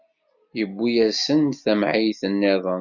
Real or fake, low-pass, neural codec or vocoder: real; 7.2 kHz; none